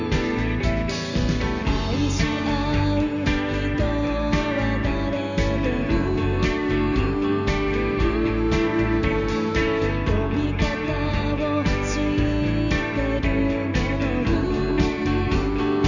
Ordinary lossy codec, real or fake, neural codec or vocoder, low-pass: none; real; none; 7.2 kHz